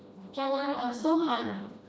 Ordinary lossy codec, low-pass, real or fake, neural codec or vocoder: none; none; fake; codec, 16 kHz, 1 kbps, FreqCodec, smaller model